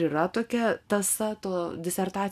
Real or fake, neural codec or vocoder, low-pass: real; none; 14.4 kHz